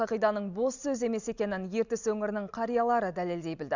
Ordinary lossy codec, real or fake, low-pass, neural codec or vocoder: none; real; 7.2 kHz; none